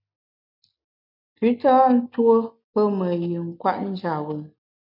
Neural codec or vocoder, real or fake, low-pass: none; real; 5.4 kHz